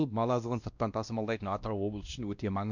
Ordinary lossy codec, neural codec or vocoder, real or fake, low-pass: none; codec, 16 kHz, 1 kbps, X-Codec, WavLM features, trained on Multilingual LibriSpeech; fake; 7.2 kHz